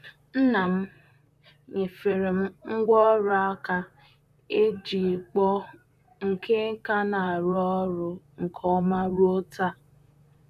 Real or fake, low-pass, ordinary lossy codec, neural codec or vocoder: fake; 14.4 kHz; none; vocoder, 44.1 kHz, 128 mel bands, Pupu-Vocoder